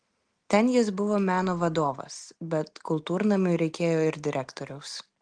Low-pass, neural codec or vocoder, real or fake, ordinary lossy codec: 9.9 kHz; none; real; Opus, 24 kbps